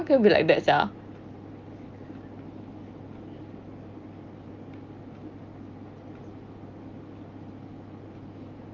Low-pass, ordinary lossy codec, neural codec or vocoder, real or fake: 7.2 kHz; Opus, 24 kbps; none; real